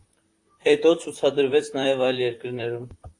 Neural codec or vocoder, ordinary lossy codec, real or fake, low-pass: vocoder, 44.1 kHz, 128 mel bands, Pupu-Vocoder; AAC, 48 kbps; fake; 10.8 kHz